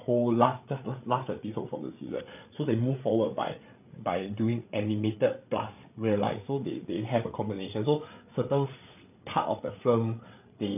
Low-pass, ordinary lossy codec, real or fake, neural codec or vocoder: 3.6 kHz; none; fake; codec, 16 kHz, 8 kbps, FreqCodec, larger model